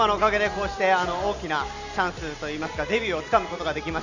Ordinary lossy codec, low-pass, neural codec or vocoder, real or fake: none; 7.2 kHz; none; real